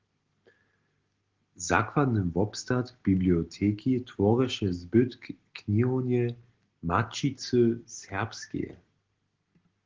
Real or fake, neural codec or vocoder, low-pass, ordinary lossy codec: real; none; 7.2 kHz; Opus, 16 kbps